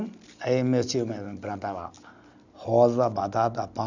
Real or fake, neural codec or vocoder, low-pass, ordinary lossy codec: real; none; 7.2 kHz; none